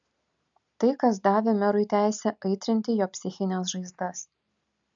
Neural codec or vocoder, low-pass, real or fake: none; 7.2 kHz; real